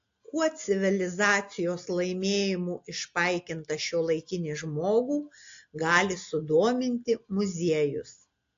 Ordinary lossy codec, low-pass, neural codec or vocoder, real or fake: AAC, 48 kbps; 7.2 kHz; none; real